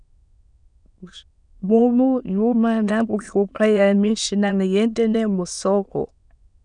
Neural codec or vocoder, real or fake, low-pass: autoencoder, 22.05 kHz, a latent of 192 numbers a frame, VITS, trained on many speakers; fake; 9.9 kHz